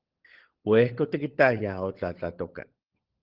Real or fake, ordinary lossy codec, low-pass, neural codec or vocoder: fake; Opus, 16 kbps; 5.4 kHz; codec, 16 kHz, 16 kbps, FunCodec, trained on LibriTTS, 50 frames a second